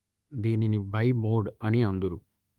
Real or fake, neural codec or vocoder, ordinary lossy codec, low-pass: fake; autoencoder, 48 kHz, 32 numbers a frame, DAC-VAE, trained on Japanese speech; Opus, 32 kbps; 19.8 kHz